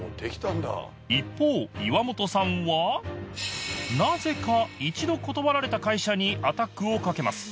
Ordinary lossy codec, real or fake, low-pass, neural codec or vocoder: none; real; none; none